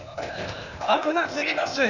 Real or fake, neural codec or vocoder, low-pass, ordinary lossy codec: fake; codec, 16 kHz, 0.8 kbps, ZipCodec; 7.2 kHz; none